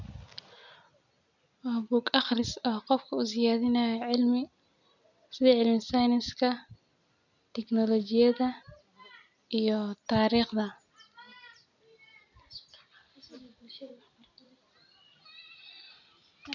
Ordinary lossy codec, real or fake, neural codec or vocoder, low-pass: none; real; none; 7.2 kHz